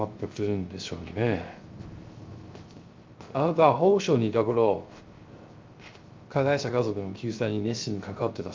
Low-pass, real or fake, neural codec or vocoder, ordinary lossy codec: 7.2 kHz; fake; codec, 16 kHz, 0.3 kbps, FocalCodec; Opus, 24 kbps